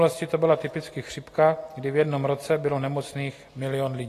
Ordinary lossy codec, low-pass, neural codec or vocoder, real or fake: AAC, 48 kbps; 14.4 kHz; none; real